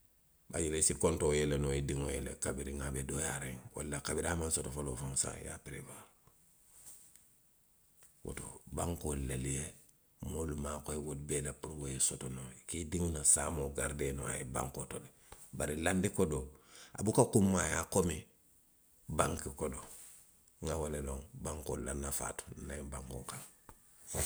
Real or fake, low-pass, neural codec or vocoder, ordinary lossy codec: real; none; none; none